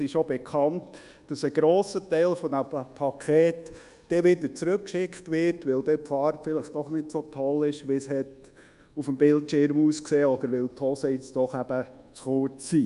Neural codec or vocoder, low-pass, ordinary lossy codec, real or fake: codec, 24 kHz, 1.2 kbps, DualCodec; 10.8 kHz; none; fake